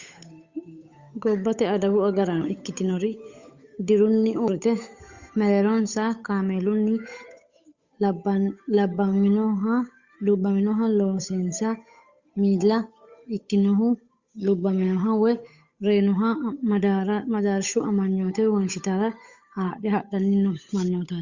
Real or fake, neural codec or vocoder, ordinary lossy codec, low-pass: fake; codec, 16 kHz, 8 kbps, FunCodec, trained on Chinese and English, 25 frames a second; Opus, 64 kbps; 7.2 kHz